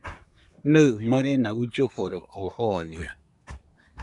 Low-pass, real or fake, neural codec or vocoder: 10.8 kHz; fake; codec, 24 kHz, 1 kbps, SNAC